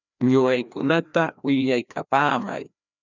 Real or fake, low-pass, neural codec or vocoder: fake; 7.2 kHz; codec, 16 kHz, 1 kbps, FreqCodec, larger model